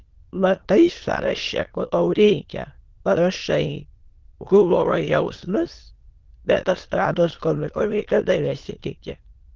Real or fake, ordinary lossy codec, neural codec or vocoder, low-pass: fake; Opus, 16 kbps; autoencoder, 22.05 kHz, a latent of 192 numbers a frame, VITS, trained on many speakers; 7.2 kHz